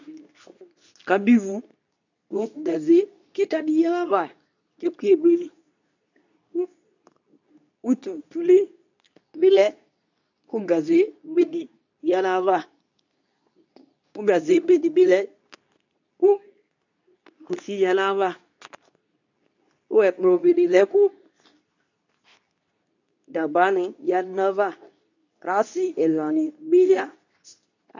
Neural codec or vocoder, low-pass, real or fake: codec, 24 kHz, 0.9 kbps, WavTokenizer, medium speech release version 2; 7.2 kHz; fake